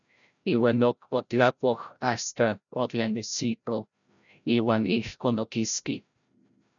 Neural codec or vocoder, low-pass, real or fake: codec, 16 kHz, 0.5 kbps, FreqCodec, larger model; 7.2 kHz; fake